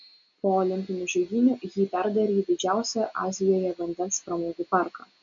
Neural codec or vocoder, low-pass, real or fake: none; 7.2 kHz; real